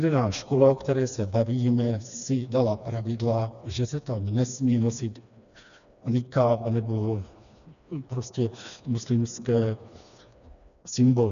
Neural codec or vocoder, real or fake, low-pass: codec, 16 kHz, 2 kbps, FreqCodec, smaller model; fake; 7.2 kHz